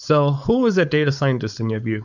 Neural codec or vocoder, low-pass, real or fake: codec, 16 kHz, 4.8 kbps, FACodec; 7.2 kHz; fake